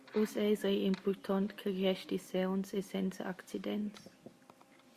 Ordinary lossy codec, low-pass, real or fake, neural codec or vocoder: Opus, 64 kbps; 14.4 kHz; real; none